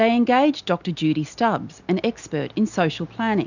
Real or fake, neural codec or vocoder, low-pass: real; none; 7.2 kHz